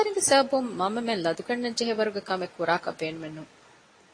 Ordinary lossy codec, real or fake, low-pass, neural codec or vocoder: AAC, 32 kbps; real; 9.9 kHz; none